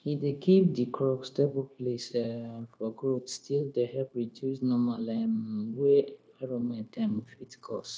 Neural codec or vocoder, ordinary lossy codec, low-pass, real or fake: codec, 16 kHz, 0.9 kbps, LongCat-Audio-Codec; none; none; fake